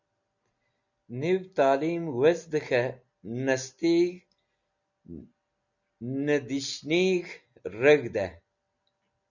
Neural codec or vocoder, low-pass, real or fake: none; 7.2 kHz; real